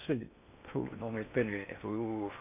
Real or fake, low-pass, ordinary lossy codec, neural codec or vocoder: fake; 3.6 kHz; none; codec, 16 kHz in and 24 kHz out, 0.6 kbps, FocalCodec, streaming, 2048 codes